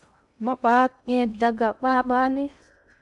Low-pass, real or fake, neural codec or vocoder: 10.8 kHz; fake; codec, 16 kHz in and 24 kHz out, 0.6 kbps, FocalCodec, streaming, 2048 codes